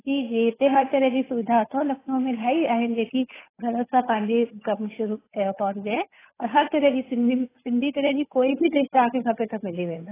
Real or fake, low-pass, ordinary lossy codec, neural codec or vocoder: fake; 3.6 kHz; AAC, 16 kbps; codec, 16 kHz, 8 kbps, FunCodec, trained on Chinese and English, 25 frames a second